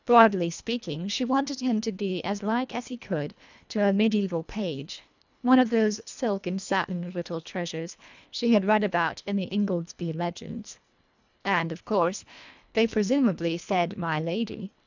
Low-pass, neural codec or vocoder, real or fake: 7.2 kHz; codec, 24 kHz, 1.5 kbps, HILCodec; fake